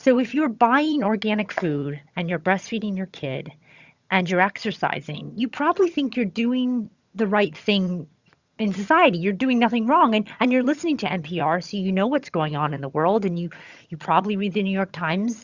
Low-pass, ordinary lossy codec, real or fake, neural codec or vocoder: 7.2 kHz; Opus, 64 kbps; fake; vocoder, 22.05 kHz, 80 mel bands, HiFi-GAN